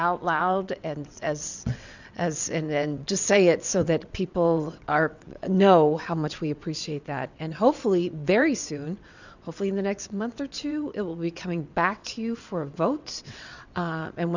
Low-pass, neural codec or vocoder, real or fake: 7.2 kHz; vocoder, 22.05 kHz, 80 mel bands, WaveNeXt; fake